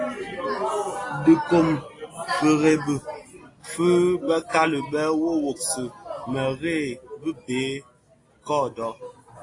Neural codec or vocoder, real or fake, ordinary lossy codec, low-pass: none; real; AAC, 32 kbps; 10.8 kHz